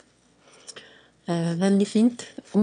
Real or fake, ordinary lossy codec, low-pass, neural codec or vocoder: fake; none; 9.9 kHz; autoencoder, 22.05 kHz, a latent of 192 numbers a frame, VITS, trained on one speaker